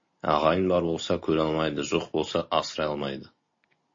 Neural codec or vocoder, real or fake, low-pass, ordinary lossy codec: none; real; 7.2 kHz; MP3, 32 kbps